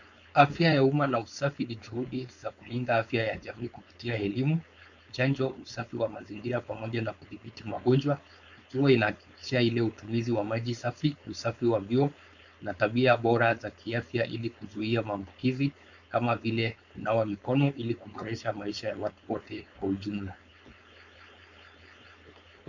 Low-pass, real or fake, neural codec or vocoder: 7.2 kHz; fake; codec, 16 kHz, 4.8 kbps, FACodec